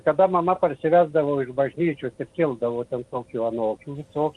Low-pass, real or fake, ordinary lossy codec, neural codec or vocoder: 10.8 kHz; real; Opus, 32 kbps; none